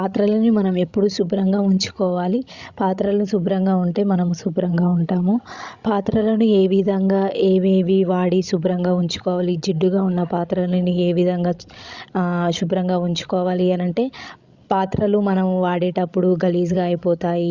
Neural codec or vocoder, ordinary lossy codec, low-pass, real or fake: codec, 16 kHz, 16 kbps, FreqCodec, larger model; Opus, 64 kbps; 7.2 kHz; fake